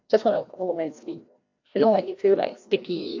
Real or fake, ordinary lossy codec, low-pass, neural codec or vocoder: fake; AAC, 48 kbps; 7.2 kHz; codec, 16 kHz, 1 kbps, FreqCodec, larger model